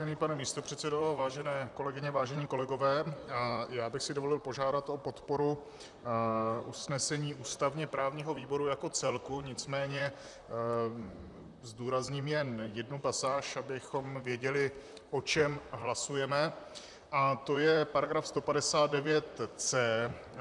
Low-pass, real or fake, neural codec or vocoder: 10.8 kHz; fake; vocoder, 44.1 kHz, 128 mel bands, Pupu-Vocoder